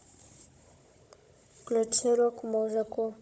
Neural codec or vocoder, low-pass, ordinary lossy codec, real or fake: codec, 16 kHz, 16 kbps, FunCodec, trained on Chinese and English, 50 frames a second; none; none; fake